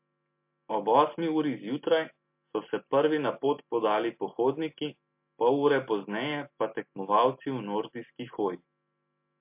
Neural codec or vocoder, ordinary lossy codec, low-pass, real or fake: none; MP3, 32 kbps; 3.6 kHz; real